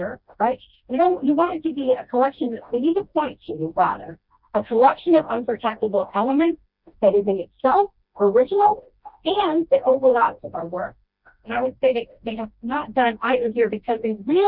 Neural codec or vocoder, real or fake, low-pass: codec, 16 kHz, 1 kbps, FreqCodec, smaller model; fake; 5.4 kHz